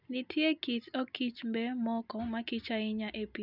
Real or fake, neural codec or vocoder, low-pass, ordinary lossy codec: real; none; 5.4 kHz; none